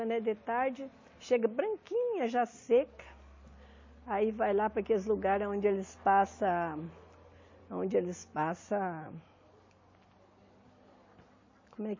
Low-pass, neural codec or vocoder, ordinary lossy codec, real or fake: 7.2 kHz; none; MP3, 32 kbps; real